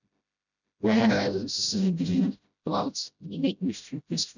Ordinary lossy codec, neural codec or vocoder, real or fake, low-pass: none; codec, 16 kHz, 0.5 kbps, FreqCodec, smaller model; fake; 7.2 kHz